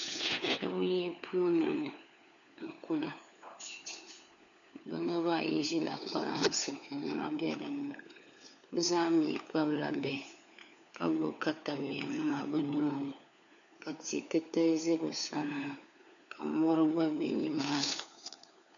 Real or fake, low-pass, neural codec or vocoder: fake; 7.2 kHz; codec, 16 kHz, 4 kbps, FunCodec, trained on LibriTTS, 50 frames a second